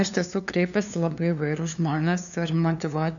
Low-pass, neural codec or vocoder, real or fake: 7.2 kHz; codec, 16 kHz, 2 kbps, FunCodec, trained on LibriTTS, 25 frames a second; fake